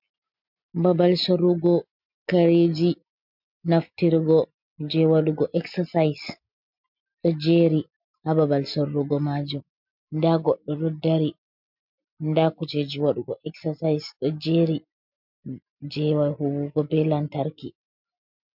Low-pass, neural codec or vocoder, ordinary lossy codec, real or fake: 5.4 kHz; none; MP3, 48 kbps; real